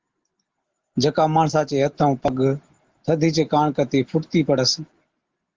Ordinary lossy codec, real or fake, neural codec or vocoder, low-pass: Opus, 16 kbps; real; none; 7.2 kHz